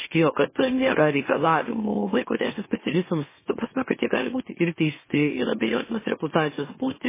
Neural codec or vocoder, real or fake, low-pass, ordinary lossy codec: autoencoder, 44.1 kHz, a latent of 192 numbers a frame, MeloTTS; fake; 3.6 kHz; MP3, 16 kbps